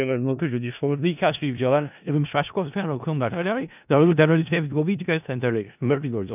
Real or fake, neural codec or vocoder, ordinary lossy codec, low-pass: fake; codec, 16 kHz in and 24 kHz out, 0.4 kbps, LongCat-Audio-Codec, four codebook decoder; none; 3.6 kHz